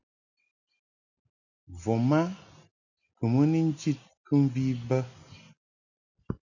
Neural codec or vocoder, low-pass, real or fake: none; 7.2 kHz; real